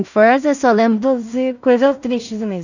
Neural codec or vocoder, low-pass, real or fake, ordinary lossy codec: codec, 16 kHz in and 24 kHz out, 0.4 kbps, LongCat-Audio-Codec, two codebook decoder; 7.2 kHz; fake; none